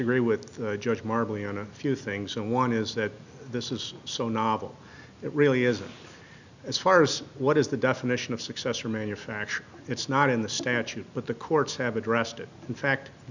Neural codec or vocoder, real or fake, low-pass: none; real; 7.2 kHz